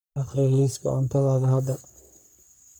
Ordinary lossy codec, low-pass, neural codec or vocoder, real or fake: none; none; codec, 44.1 kHz, 3.4 kbps, Pupu-Codec; fake